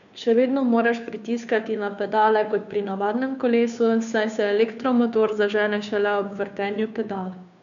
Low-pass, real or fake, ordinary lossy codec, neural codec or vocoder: 7.2 kHz; fake; none; codec, 16 kHz, 2 kbps, FunCodec, trained on Chinese and English, 25 frames a second